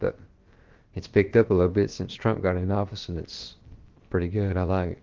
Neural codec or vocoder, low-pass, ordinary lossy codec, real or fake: codec, 16 kHz, about 1 kbps, DyCAST, with the encoder's durations; 7.2 kHz; Opus, 16 kbps; fake